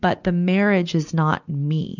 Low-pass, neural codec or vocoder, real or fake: 7.2 kHz; none; real